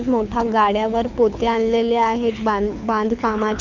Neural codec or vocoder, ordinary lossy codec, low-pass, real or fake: codec, 24 kHz, 6 kbps, HILCodec; none; 7.2 kHz; fake